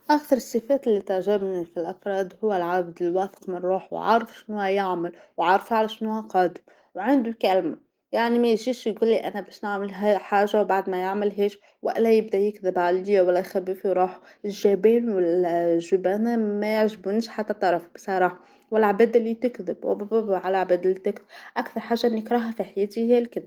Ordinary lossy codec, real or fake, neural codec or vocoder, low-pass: Opus, 24 kbps; real; none; 19.8 kHz